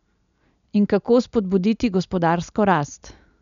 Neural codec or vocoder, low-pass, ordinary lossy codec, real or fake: none; 7.2 kHz; none; real